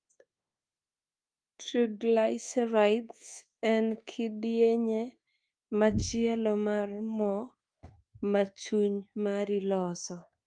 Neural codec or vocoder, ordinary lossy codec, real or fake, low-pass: codec, 24 kHz, 1.2 kbps, DualCodec; Opus, 32 kbps; fake; 9.9 kHz